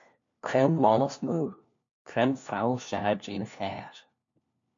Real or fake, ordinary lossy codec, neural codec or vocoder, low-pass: fake; MP3, 64 kbps; codec, 16 kHz, 1 kbps, FunCodec, trained on LibriTTS, 50 frames a second; 7.2 kHz